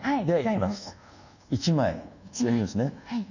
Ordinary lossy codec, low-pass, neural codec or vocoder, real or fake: none; 7.2 kHz; codec, 24 kHz, 1.2 kbps, DualCodec; fake